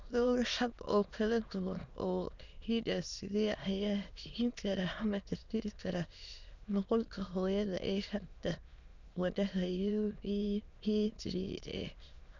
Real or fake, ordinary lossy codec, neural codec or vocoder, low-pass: fake; none; autoencoder, 22.05 kHz, a latent of 192 numbers a frame, VITS, trained on many speakers; 7.2 kHz